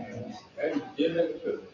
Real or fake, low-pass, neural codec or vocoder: fake; 7.2 kHz; vocoder, 24 kHz, 100 mel bands, Vocos